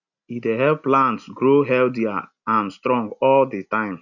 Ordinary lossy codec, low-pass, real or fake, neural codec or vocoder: none; 7.2 kHz; real; none